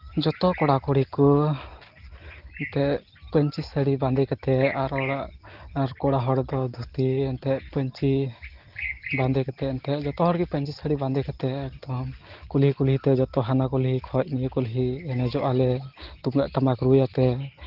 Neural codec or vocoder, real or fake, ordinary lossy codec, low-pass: none; real; Opus, 16 kbps; 5.4 kHz